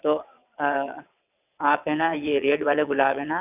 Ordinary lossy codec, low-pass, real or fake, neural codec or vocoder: none; 3.6 kHz; fake; vocoder, 22.05 kHz, 80 mel bands, WaveNeXt